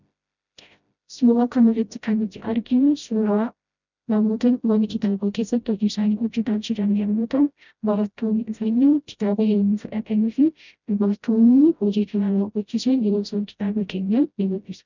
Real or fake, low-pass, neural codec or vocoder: fake; 7.2 kHz; codec, 16 kHz, 0.5 kbps, FreqCodec, smaller model